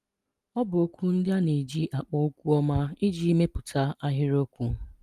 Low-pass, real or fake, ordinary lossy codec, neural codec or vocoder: 14.4 kHz; real; Opus, 32 kbps; none